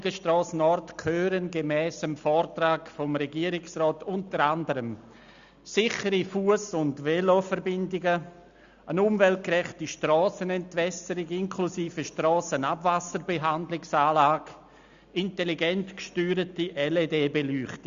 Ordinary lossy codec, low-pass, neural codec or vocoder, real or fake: Opus, 64 kbps; 7.2 kHz; none; real